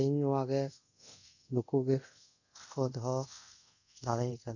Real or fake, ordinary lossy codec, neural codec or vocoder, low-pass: fake; none; codec, 24 kHz, 0.5 kbps, DualCodec; 7.2 kHz